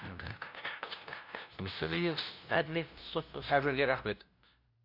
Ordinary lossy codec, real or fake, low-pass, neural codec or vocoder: none; fake; 5.4 kHz; codec, 16 kHz, 0.5 kbps, FunCodec, trained on LibriTTS, 25 frames a second